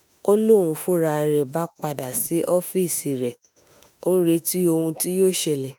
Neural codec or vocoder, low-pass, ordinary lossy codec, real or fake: autoencoder, 48 kHz, 32 numbers a frame, DAC-VAE, trained on Japanese speech; none; none; fake